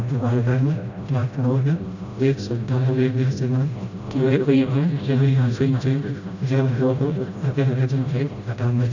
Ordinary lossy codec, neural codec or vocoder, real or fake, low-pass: none; codec, 16 kHz, 0.5 kbps, FreqCodec, smaller model; fake; 7.2 kHz